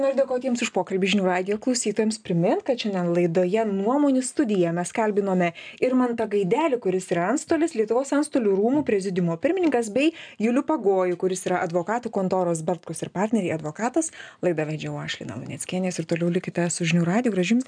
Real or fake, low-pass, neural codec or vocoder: real; 9.9 kHz; none